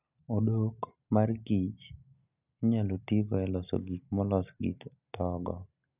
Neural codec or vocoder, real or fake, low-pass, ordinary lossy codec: none; real; 3.6 kHz; none